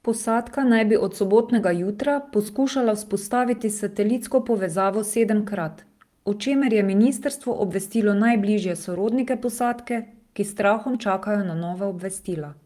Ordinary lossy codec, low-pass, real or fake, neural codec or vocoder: Opus, 32 kbps; 14.4 kHz; fake; vocoder, 44.1 kHz, 128 mel bands every 256 samples, BigVGAN v2